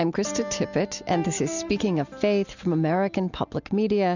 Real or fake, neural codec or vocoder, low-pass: real; none; 7.2 kHz